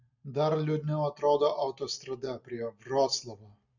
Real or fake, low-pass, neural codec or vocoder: real; 7.2 kHz; none